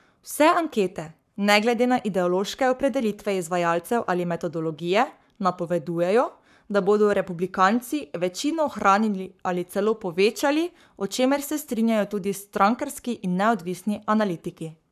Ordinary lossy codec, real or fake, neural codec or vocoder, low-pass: none; fake; codec, 44.1 kHz, 7.8 kbps, Pupu-Codec; 14.4 kHz